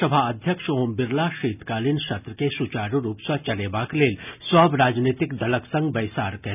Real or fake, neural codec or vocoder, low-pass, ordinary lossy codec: real; none; 3.6 kHz; none